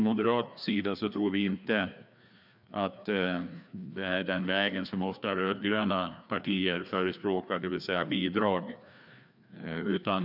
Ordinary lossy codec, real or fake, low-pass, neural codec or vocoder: none; fake; 5.4 kHz; codec, 16 kHz, 2 kbps, FreqCodec, larger model